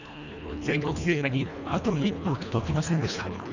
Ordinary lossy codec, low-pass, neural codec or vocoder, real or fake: none; 7.2 kHz; codec, 24 kHz, 1.5 kbps, HILCodec; fake